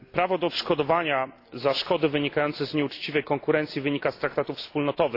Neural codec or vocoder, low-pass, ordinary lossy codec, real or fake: none; 5.4 kHz; AAC, 32 kbps; real